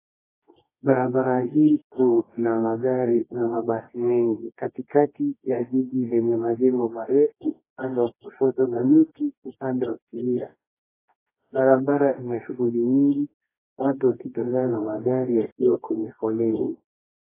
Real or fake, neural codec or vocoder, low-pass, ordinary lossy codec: fake; codec, 24 kHz, 0.9 kbps, WavTokenizer, medium music audio release; 3.6 kHz; AAC, 16 kbps